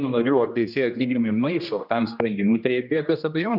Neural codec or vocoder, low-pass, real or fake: codec, 16 kHz, 1 kbps, X-Codec, HuBERT features, trained on general audio; 5.4 kHz; fake